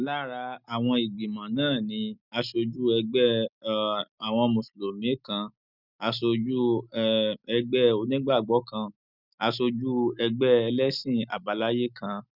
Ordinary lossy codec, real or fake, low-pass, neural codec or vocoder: none; real; 5.4 kHz; none